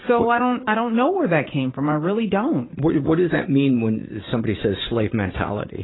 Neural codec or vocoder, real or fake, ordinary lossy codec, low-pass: vocoder, 44.1 kHz, 80 mel bands, Vocos; fake; AAC, 16 kbps; 7.2 kHz